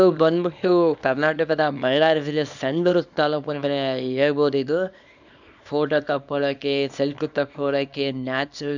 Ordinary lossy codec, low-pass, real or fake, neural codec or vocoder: none; 7.2 kHz; fake; codec, 24 kHz, 0.9 kbps, WavTokenizer, small release